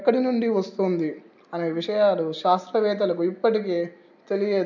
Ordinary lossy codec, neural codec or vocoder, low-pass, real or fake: none; none; 7.2 kHz; real